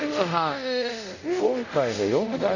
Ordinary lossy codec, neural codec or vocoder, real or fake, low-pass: none; codec, 24 kHz, 0.5 kbps, DualCodec; fake; 7.2 kHz